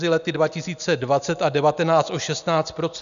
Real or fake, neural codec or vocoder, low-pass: real; none; 7.2 kHz